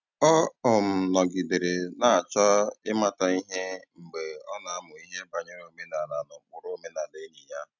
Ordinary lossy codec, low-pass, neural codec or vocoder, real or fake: none; 7.2 kHz; none; real